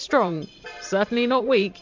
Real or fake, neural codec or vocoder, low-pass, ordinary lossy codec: fake; vocoder, 44.1 kHz, 128 mel bands every 512 samples, BigVGAN v2; 7.2 kHz; MP3, 64 kbps